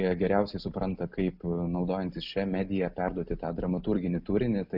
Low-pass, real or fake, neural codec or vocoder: 5.4 kHz; real; none